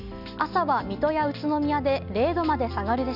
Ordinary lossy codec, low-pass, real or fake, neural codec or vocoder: none; 5.4 kHz; real; none